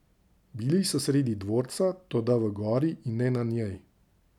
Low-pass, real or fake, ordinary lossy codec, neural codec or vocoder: 19.8 kHz; real; none; none